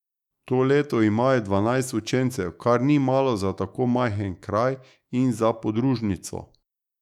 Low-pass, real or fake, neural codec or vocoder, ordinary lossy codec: 19.8 kHz; fake; autoencoder, 48 kHz, 128 numbers a frame, DAC-VAE, trained on Japanese speech; none